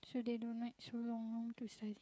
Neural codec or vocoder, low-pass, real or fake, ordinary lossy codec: codec, 16 kHz, 16 kbps, FreqCodec, smaller model; none; fake; none